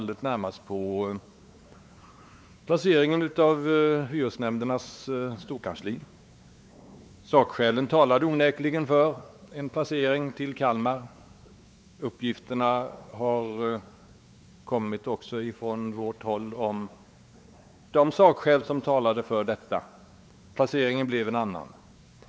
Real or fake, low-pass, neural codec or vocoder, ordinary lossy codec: fake; none; codec, 16 kHz, 4 kbps, X-Codec, WavLM features, trained on Multilingual LibriSpeech; none